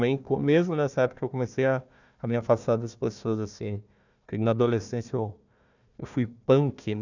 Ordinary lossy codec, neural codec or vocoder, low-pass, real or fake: none; codec, 16 kHz, 1 kbps, FunCodec, trained on Chinese and English, 50 frames a second; 7.2 kHz; fake